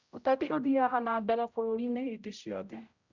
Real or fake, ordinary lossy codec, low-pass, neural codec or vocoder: fake; Opus, 64 kbps; 7.2 kHz; codec, 16 kHz, 0.5 kbps, X-Codec, HuBERT features, trained on general audio